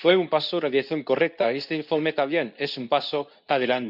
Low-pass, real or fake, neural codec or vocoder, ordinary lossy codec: 5.4 kHz; fake; codec, 24 kHz, 0.9 kbps, WavTokenizer, medium speech release version 2; none